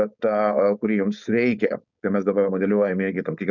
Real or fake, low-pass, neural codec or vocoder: fake; 7.2 kHz; codec, 16 kHz, 4.8 kbps, FACodec